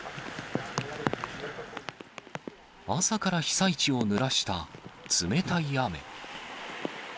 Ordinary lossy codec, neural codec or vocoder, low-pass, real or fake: none; none; none; real